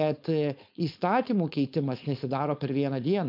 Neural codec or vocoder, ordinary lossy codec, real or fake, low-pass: codec, 16 kHz, 4.8 kbps, FACodec; MP3, 48 kbps; fake; 5.4 kHz